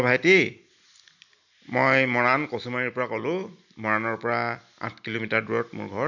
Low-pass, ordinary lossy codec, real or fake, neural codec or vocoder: 7.2 kHz; none; real; none